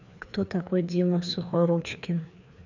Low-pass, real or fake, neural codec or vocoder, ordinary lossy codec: 7.2 kHz; fake; codec, 16 kHz, 2 kbps, FreqCodec, larger model; none